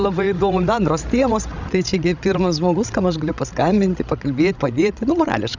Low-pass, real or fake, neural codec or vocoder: 7.2 kHz; fake; codec, 16 kHz, 16 kbps, FreqCodec, larger model